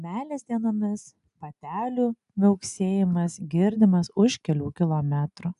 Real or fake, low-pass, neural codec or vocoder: real; 10.8 kHz; none